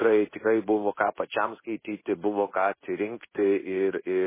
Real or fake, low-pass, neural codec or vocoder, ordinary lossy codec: fake; 3.6 kHz; codec, 16 kHz in and 24 kHz out, 1 kbps, XY-Tokenizer; MP3, 16 kbps